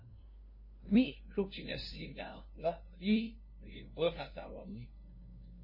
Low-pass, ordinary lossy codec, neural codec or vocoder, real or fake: 5.4 kHz; MP3, 24 kbps; codec, 16 kHz, 0.5 kbps, FunCodec, trained on LibriTTS, 25 frames a second; fake